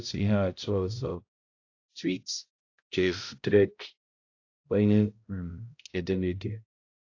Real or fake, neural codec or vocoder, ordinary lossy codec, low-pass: fake; codec, 16 kHz, 0.5 kbps, X-Codec, HuBERT features, trained on balanced general audio; AAC, 48 kbps; 7.2 kHz